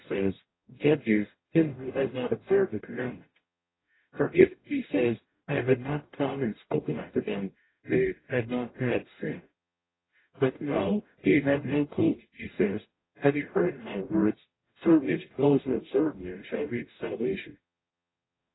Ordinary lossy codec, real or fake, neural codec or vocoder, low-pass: AAC, 16 kbps; fake; codec, 44.1 kHz, 0.9 kbps, DAC; 7.2 kHz